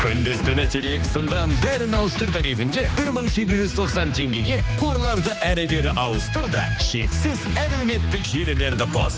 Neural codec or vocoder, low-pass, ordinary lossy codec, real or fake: codec, 16 kHz, 2 kbps, X-Codec, HuBERT features, trained on general audio; none; none; fake